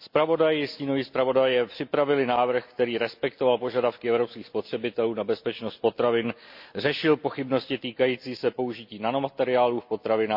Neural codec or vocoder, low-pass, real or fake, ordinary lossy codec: none; 5.4 kHz; real; MP3, 48 kbps